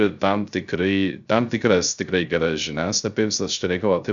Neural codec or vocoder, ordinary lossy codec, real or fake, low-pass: codec, 16 kHz, 0.3 kbps, FocalCodec; Opus, 64 kbps; fake; 7.2 kHz